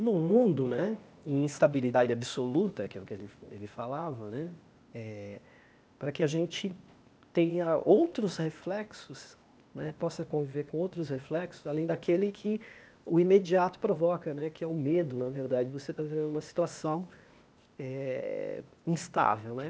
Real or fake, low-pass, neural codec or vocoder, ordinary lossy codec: fake; none; codec, 16 kHz, 0.8 kbps, ZipCodec; none